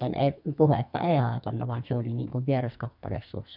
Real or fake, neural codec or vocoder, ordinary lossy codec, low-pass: fake; codec, 44.1 kHz, 2.6 kbps, SNAC; none; 5.4 kHz